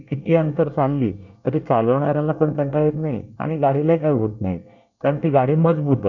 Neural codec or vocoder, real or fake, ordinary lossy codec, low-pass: codec, 24 kHz, 1 kbps, SNAC; fake; none; 7.2 kHz